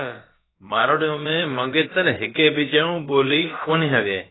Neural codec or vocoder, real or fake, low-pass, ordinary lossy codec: codec, 16 kHz, about 1 kbps, DyCAST, with the encoder's durations; fake; 7.2 kHz; AAC, 16 kbps